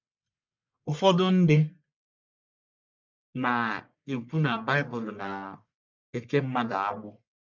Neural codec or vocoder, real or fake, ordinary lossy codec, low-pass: codec, 44.1 kHz, 1.7 kbps, Pupu-Codec; fake; MP3, 64 kbps; 7.2 kHz